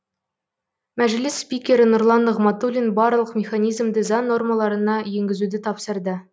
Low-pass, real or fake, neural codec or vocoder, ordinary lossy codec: none; real; none; none